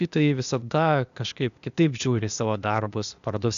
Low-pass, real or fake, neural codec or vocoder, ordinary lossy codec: 7.2 kHz; fake; codec, 16 kHz, 0.8 kbps, ZipCodec; MP3, 96 kbps